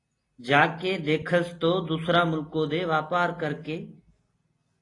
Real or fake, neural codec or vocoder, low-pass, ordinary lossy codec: real; none; 10.8 kHz; AAC, 32 kbps